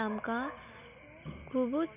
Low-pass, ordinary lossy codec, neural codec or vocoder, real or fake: 3.6 kHz; none; none; real